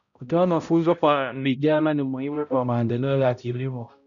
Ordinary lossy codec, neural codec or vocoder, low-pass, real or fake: none; codec, 16 kHz, 0.5 kbps, X-Codec, HuBERT features, trained on balanced general audio; 7.2 kHz; fake